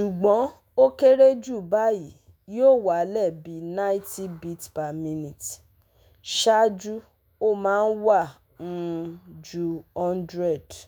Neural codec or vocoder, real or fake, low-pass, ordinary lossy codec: autoencoder, 48 kHz, 128 numbers a frame, DAC-VAE, trained on Japanese speech; fake; none; none